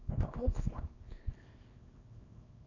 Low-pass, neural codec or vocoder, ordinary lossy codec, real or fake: 7.2 kHz; codec, 24 kHz, 0.9 kbps, WavTokenizer, small release; none; fake